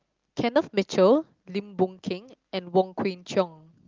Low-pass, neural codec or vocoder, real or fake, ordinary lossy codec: 7.2 kHz; none; real; Opus, 32 kbps